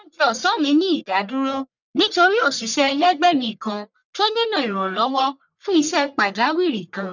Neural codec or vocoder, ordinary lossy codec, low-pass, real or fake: codec, 44.1 kHz, 1.7 kbps, Pupu-Codec; none; 7.2 kHz; fake